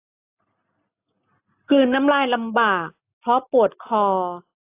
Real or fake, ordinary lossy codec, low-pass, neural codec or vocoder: real; AAC, 24 kbps; 3.6 kHz; none